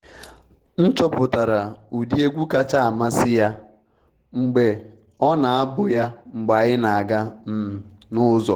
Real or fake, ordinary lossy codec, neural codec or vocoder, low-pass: fake; Opus, 16 kbps; vocoder, 48 kHz, 128 mel bands, Vocos; 19.8 kHz